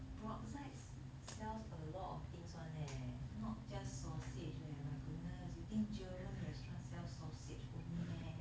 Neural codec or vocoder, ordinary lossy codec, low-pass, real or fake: none; none; none; real